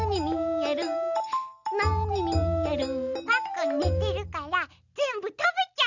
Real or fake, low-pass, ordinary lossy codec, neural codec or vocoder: real; 7.2 kHz; none; none